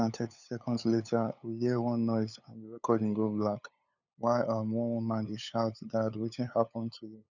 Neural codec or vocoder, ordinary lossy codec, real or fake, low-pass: codec, 16 kHz, 8 kbps, FunCodec, trained on LibriTTS, 25 frames a second; none; fake; 7.2 kHz